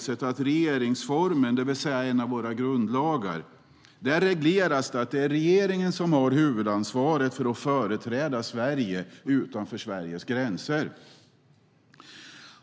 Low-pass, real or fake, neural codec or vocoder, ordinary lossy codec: none; real; none; none